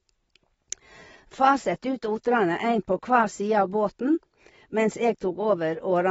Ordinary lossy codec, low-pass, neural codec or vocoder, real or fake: AAC, 24 kbps; 19.8 kHz; none; real